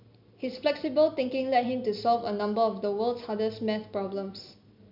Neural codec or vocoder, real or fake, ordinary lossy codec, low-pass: none; real; none; 5.4 kHz